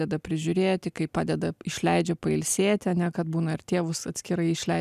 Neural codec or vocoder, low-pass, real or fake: none; 14.4 kHz; real